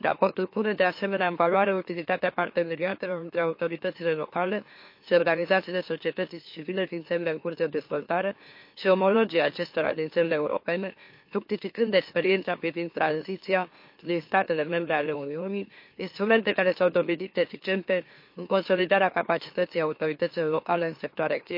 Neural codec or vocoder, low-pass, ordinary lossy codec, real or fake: autoencoder, 44.1 kHz, a latent of 192 numbers a frame, MeloTTS; 5.4 kHz; MP3, 32 kbps; fake